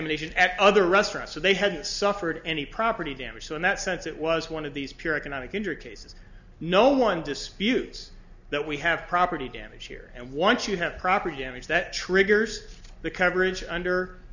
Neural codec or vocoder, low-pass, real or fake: none; 7.2 kHz; real